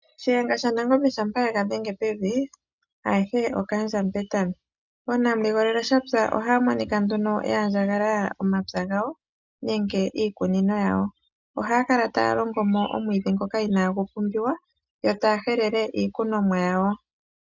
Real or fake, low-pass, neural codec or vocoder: real; 7.2 kHz; none